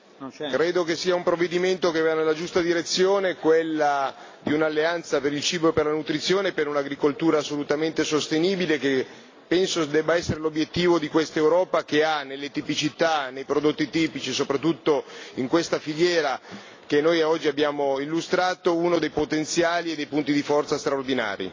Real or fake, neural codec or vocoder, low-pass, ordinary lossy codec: real; none; 7.2 kHz; AAC, 32 kbps